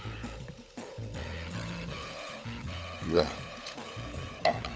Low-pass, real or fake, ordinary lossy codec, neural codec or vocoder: none; fake; none; codec, 16 kHz, 16 kbps, FunCodec, trained on LibriTTS, 50 frames a second